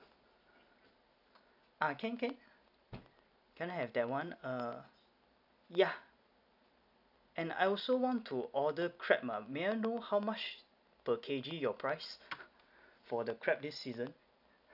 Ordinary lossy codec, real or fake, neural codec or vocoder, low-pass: none; real; none; 5.4 kHz